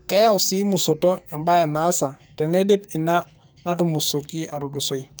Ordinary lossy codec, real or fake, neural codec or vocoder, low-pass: none; fake; codec, 44.1 kHz, 2.6 kbps, SNAC; none